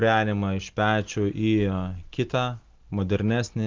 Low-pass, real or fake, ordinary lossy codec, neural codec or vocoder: 7.2 kHz; real; Opus, 32 kbps; none